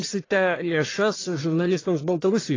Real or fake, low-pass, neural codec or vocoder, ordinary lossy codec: fake; 7.2 kHz; codec, 44.1 kHz, 1.7 kbps, Pupu-Codec; AAC, 32 kbps